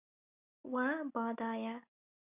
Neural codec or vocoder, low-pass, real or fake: none; 3.6 kHz; real